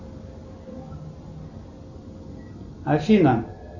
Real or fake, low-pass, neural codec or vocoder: real; 7.2 kHz; none